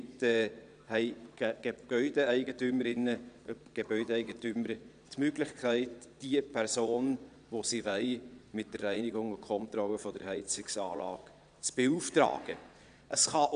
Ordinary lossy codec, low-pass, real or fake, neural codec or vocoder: none; 9.9 kHz; fake; vocoder, 22.05 kHz, 80 mel bands, Vocos